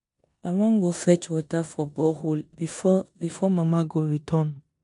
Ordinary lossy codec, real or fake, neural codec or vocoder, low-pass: none; fake; codec, 16 kHz in and 24 kHz out, 0.9 kbps, LongCat-Audio-Codec, four codebook decoder; 10.8 kHz